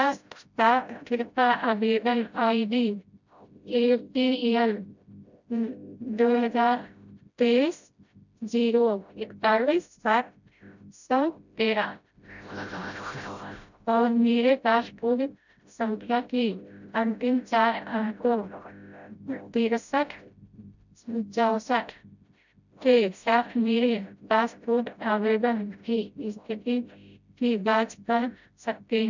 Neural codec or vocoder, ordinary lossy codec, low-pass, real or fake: codec, 16 kHz, 0.5 kbps, FreqCodec, smaller model; none; 7.2 kHz; fake